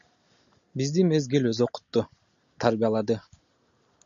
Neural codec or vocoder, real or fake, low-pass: none; real; 7.2 kHz